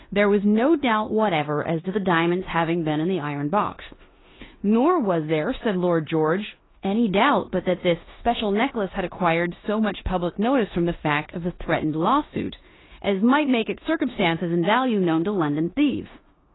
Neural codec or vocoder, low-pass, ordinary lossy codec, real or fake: codec, 16 kHz in and 24 kHz out, 0.9 kbps, LongCat-Audio-Codec, four codebook decoder; 7.2 kHz; AAC, 16 kbps; fake